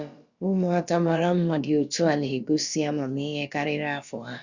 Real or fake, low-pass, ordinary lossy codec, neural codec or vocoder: fake; 7.2 kHz; Opus, 64 kbps; codec, 16 kHz, about 1 kbps, DyCAST, with the encoder's durations